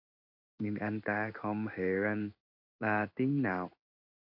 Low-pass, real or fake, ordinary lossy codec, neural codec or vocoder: 5.4 kHz; fake; AAC, 48 kbps; codec, 16 kHz in and 24 kHz out, 1 kbps, XY-Tokenizer